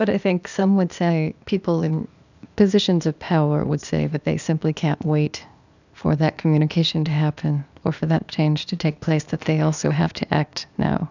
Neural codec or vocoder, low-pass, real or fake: codec, 16 kHz, 0.8 kbps, ZipCodec; 7.2 kHz; fake